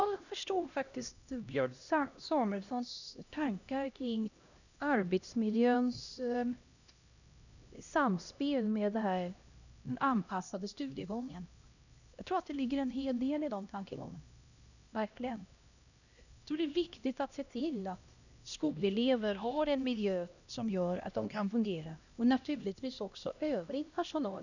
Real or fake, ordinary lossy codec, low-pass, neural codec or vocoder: fake; none; 7.2 kHz; codec, 16 kHz, 1 kbps, X-Codec, HuBERT features, trained on LibriSpeech